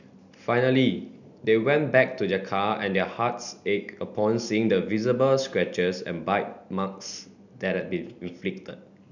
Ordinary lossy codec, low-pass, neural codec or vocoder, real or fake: none; 7.2 kHz; none; real